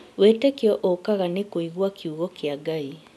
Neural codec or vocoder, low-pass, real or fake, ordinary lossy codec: none; none; real; none